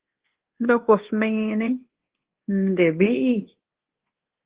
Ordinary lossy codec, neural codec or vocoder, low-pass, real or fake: Opus, 16 kbps; codec, 24 kHz, 1.2 kbps, DualCodec; 3.6 kHz; fake